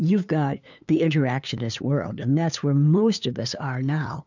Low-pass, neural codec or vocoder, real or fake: 7.2 kHz; codec, 16 kHz, 2 kbps, FunCodec, trained on LibriTTS, 25 frames a second; fake